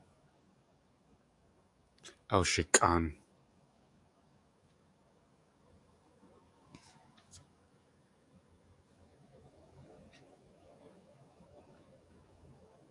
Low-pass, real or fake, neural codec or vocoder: 10.8 kHz; fake; codec, 44.1 kHz, 7.8 kbps, DAC